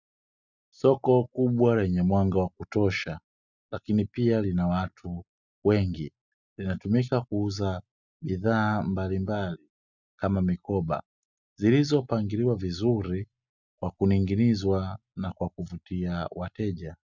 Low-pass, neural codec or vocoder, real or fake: 7.2 kHz; none; real